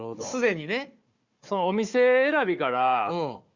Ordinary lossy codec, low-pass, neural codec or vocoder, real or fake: Opus, 64 kbps; 7.2 kHz; codec, 16 kHz, 4 kbps, FunCodec, trained on Chinese and English, 50 frames a second; fake